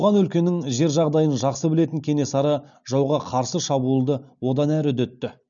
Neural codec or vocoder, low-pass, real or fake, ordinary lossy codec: none; 7.2 kHz; real; none